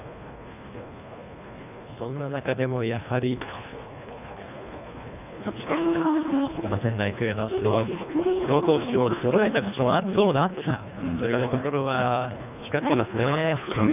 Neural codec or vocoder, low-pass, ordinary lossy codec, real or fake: codec, 24 kHz, 1.5 kbps, HILCodec; 3.6 kHz; none; fake